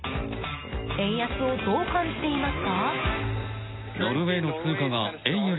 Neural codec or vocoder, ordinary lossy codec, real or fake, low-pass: none; AAC, 16 kbps; real; 7.2 kHz